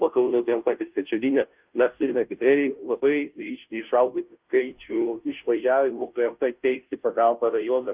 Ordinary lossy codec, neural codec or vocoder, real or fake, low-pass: Opus, 24 kbps; codec, 16 kHz, 0.5 kbps, FunCodec, trained on Chinese and English, 25 frames a second; fake; 3.6 kHz